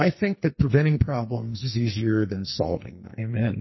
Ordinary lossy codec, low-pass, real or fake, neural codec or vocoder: MP3, 24 kbps; 7.2 kHz; fake; codec, 44.1 kHz, 2.6 kbps, SNAC